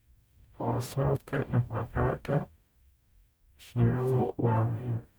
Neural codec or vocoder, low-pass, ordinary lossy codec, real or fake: codec, 44.1 kHz, 0.9 kbps, DAC; none; none; fake